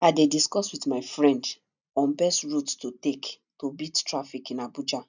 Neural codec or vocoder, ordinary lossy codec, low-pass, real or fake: none; none; 7.2 kHz; real